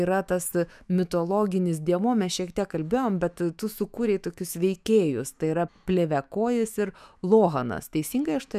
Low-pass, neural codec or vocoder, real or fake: 14.4 kHz; autoencoder, 48 kHz, 128 numbers a frame, DAC-VAE, trained on Japanese speech; fake